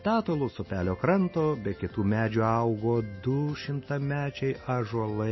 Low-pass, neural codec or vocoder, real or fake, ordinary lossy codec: 7.2 kHz; none; real; MP3, 24 kbps